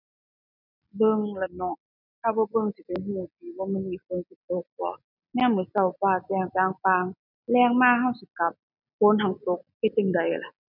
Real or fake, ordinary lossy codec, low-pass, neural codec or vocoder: real; none; 5.4 kHz; none